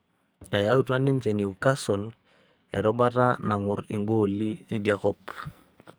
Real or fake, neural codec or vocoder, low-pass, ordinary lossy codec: fake; codec, 44.1 kHz, 2.6 kbps, SNAC; none; none